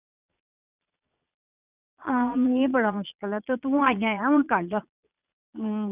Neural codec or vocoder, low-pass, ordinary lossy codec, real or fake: vocoder, 22.05 kHz, 80 mel bands, Vocos; 3.6 kHz; none; fake